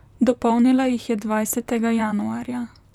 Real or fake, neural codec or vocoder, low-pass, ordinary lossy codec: fake; vocoder, 44.1 kHz, 128 mel bands, Pupu-Vocoder; 19.8 kHz; none